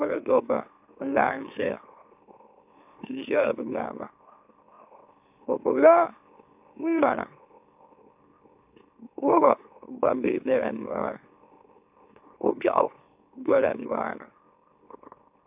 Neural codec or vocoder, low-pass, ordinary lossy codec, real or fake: autoencoder, 44.1 kHz, a latent of 192 numbers a frame, MeloTTS; 3.6 kHz; AAC, 32 kbps; fake